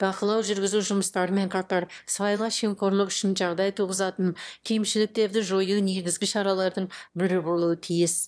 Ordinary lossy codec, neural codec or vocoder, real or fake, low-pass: none; autoencoder, 22.05 kHz, a latent of 192 numbers a frame, VITS, trained on one speaker; fake; none